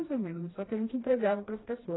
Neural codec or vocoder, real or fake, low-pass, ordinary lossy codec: codec, 16 kHz, 1 kbps, FreqCodec, smaller model; fake; 7.2 kHz; AAC, 16 kbps